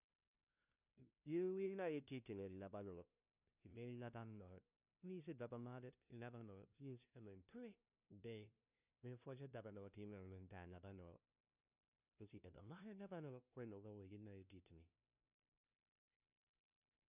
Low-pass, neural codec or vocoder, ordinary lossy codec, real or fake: 3.6 kHz; codec, 16 kHz, 0.5 kbps, FunCodec, trained on LibriTTS, 25 frames a second; none; fake